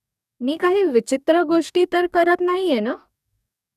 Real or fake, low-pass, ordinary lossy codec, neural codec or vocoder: fake; 14.4 kHz; none; codec, 44.1 kHz, 2.6 kbps, DAC